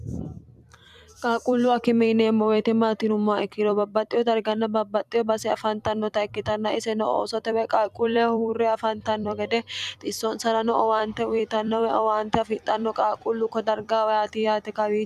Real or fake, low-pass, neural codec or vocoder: fake; 14.4 kHz; vocoder, 44.1 kHz, 128 mel bands every 256 samples, BigVGAN v2